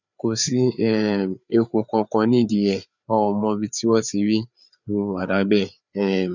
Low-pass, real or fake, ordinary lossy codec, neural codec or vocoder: 7.2 kHz; fake; none; codec, 16 kHz, 4 kbps, FreqCodec, larger model